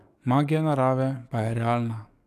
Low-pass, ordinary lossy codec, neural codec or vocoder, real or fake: 14.4 kHz; none; codec, 44.1 kHz, 7.8 kbps, DAC; fake